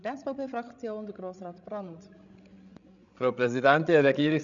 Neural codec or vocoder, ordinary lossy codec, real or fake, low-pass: codec, 16 kHz, 16 kbps, FreqCodec, larger model; none; fake; 7.2 kHz